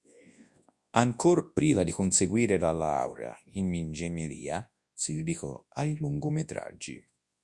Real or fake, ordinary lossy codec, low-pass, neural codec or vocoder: fake; Opus, 64 kbps; 10.8 kHz; codec, 24 kHz, 0.9 kbps, WavTokenizer, large speech release